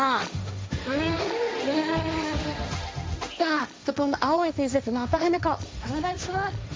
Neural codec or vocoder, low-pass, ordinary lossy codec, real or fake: codec, 16 kHz, 1.1 kbps, Voila-Tokenizer; none; none; fake